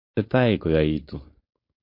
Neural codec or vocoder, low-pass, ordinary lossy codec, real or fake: codec, 16 kHz, 4.8 kbps, FACodec; 5.4 kHz; MP3, 32 kbps; fake